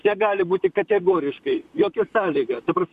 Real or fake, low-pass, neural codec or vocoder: fake; 14.4 kHz; vocoder, 44.1 kHz, 128 mel bands, Pupu-Vocoder